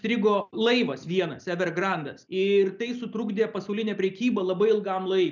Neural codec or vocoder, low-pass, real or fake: none; 7.2 kHz; real